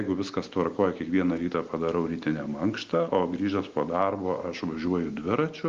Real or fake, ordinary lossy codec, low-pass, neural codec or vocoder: real; Opus, 32 kbps; 7.2 kHz; none